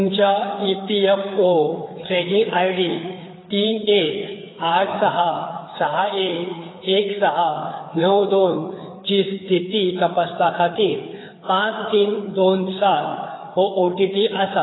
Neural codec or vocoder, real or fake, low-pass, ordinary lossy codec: codec, 16 kHz, 4 kbps, FreqCodec, larger model; fake; 7.2 kHz; AAC, 16 kbps